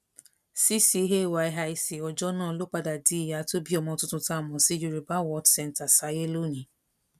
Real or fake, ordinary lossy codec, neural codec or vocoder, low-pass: real; none; none; 14.4 kHz